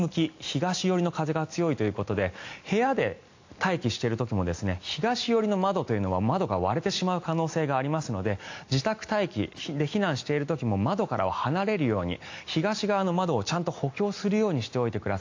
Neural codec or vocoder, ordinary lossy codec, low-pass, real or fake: none; AAC, 48 kbps; 7.2 kHz; real